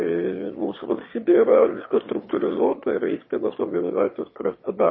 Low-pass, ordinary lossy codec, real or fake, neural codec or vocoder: 7.2 kHz; MP3, 24 kbps; fake; autoencoder, 22.05 kHz, a latent of 192 numbers a frame, VITS, trained on one speaker